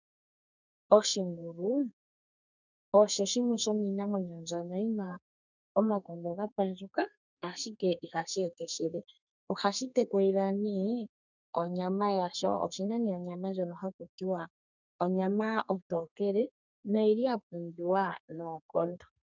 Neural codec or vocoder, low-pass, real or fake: codec, 32 kHz, 1.9 kbps, SNAC; 7.2 kHz; fake